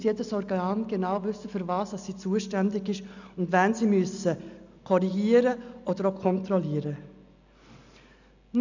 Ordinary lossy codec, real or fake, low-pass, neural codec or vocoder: none; real; 7.2 kHz; none